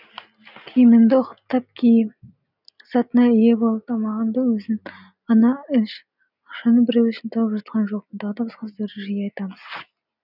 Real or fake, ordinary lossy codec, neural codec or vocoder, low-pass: real; none; none; 5.4 kHz